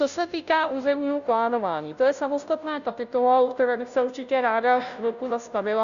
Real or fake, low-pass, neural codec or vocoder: fake; 7.2 kHz; codec, 16 kHz, 0.5 kbps, FunCodec, trained on Chinese and English, 25 frames a second